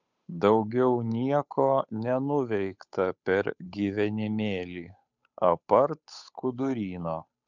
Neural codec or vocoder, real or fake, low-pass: codec, 16 kHz, 8 kbps, FunCodec, trained on Chinese and English, 25 frames a second; fake; 7.2 kHz